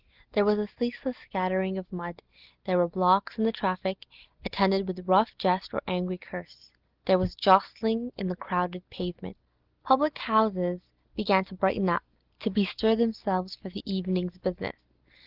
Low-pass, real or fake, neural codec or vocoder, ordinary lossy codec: 5.4 kHz; real; none; Opus, 16 kbps